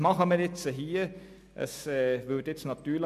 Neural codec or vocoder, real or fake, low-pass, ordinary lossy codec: none; real; 14.4 kHz; MP3, 96 kbps